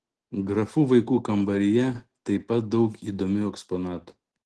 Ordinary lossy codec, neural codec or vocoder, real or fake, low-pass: Opus, 16 kbps; none; real; 10.8 kHz